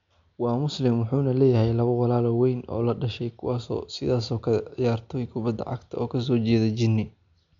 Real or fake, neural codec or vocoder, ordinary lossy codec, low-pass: real; none; MP3, 64 kbps; 7.2 kHz